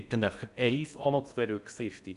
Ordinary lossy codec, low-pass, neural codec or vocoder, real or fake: none; 10.8 kHz; codec, 16 kHz in and 24 kHz out, 0.6 kbps, FocalCodec, streaming, 4096 codes; fake